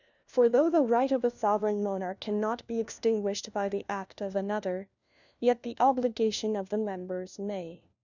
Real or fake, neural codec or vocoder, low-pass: fake; codec, 16 kHz, 1 kbps, FunCodec, trained on LibriTTS, 50 frames a second; 7.2 kHz